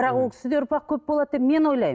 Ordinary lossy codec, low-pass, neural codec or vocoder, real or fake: none; none; none; real